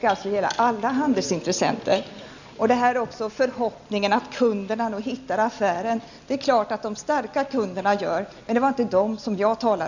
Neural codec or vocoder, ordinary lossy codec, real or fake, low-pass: none; none; real; 7.2 kHz